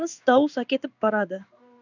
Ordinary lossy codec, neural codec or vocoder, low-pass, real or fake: none; codec, 16 kHz in and 24 kHz out, 1 kbps, XY-Tokenizer; 7.2 kHz; fake